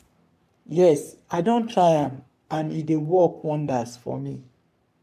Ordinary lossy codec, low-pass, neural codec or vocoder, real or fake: none; 14.4 kHz; codec, 44.1 kHz, 3.4 kbps, Pupu-Codec; fake